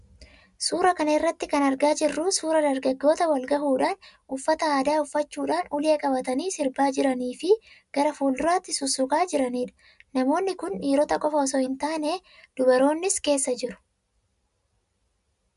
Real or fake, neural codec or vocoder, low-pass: real; none; 10.8 kHz